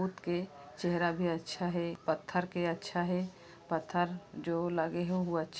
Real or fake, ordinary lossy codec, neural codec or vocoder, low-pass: real; none; none; none